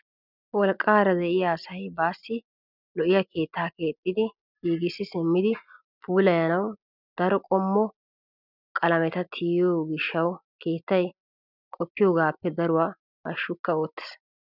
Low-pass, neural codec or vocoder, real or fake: 5.4 kHz; none; real